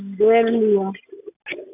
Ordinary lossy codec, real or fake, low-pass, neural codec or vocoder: none; real; 3.6 kHz; none